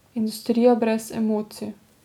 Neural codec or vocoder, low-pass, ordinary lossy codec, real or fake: none; 19.8 kHz; none; real